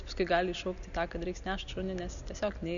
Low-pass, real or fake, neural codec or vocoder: 7.2 kHz; real; none